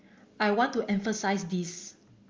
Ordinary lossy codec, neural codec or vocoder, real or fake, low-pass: Opus, 32 kbps; none; real; 7.2 kHz